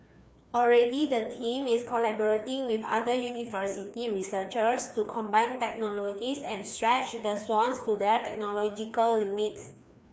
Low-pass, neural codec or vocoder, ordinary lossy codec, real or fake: none; codec, 16 kHz, 2 kbps, FreqCodec, larger model; none; fake